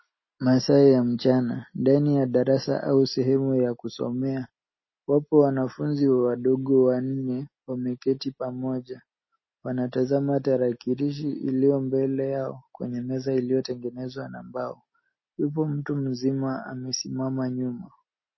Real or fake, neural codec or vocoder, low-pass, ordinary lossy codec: real; none; 7.2 kHz; MP3, 24 kbps